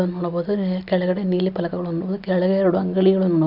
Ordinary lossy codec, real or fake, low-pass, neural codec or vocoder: none; real; 5.4 kHz; none